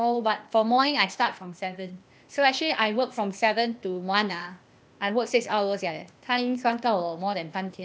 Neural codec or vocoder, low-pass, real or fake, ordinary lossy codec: codec, 16 kHz, 0.8 kbps, ZipCodec; none; fake; none